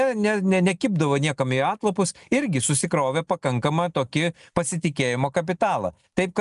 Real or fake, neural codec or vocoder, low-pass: real; none; 10.8 kHz